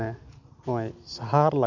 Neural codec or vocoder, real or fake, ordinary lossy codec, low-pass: none; real; none; 7.2 kHz